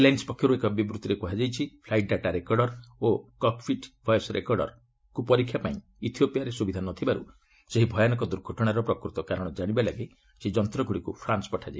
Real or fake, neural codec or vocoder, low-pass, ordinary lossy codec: real; none; none; none